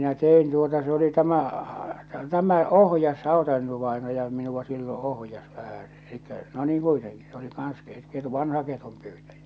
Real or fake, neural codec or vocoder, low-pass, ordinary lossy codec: real; none; none; none